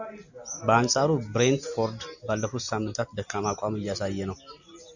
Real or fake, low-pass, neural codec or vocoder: real; 7.2 kHz; none